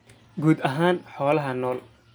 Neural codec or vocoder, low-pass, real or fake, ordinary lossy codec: none; none; real; none